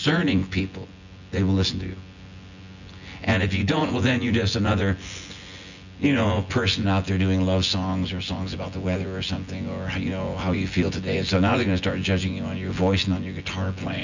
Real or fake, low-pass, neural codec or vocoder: fake; 7.2 kHz; vocoder, 24 kHz, 100 mel bands, Vocos